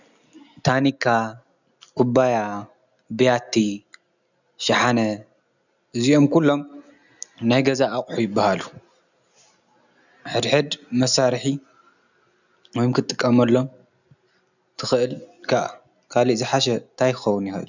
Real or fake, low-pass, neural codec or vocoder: real; 7.2 kHz; none